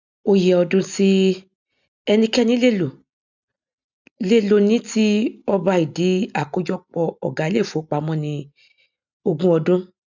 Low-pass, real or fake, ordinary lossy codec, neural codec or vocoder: 7.2 kHz; real; none; none